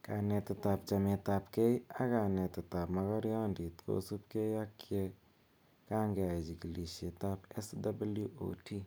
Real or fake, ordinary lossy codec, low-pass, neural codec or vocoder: real; none; none; none